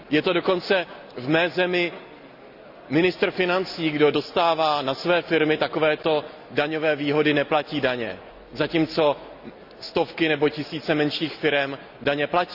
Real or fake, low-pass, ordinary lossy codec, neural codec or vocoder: real; 5.4 kHz; none; none